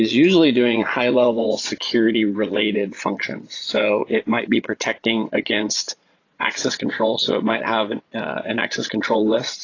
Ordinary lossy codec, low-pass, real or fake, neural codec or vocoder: AAC, 32 kbps; 7.2 kHz; fake; vocoder, 22.05 kHz, 80 mel bands, Vocos